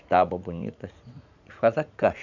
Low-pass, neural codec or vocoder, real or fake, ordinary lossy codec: 7.2 kHz; vocoder, 44.1 kHz, 80 mel bands, Vocos; fake; none